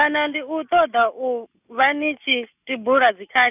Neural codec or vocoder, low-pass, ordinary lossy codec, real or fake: none; 3.6 kHz; none; real